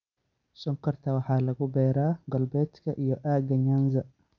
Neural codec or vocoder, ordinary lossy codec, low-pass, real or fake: none; none; 7.2 kHz; real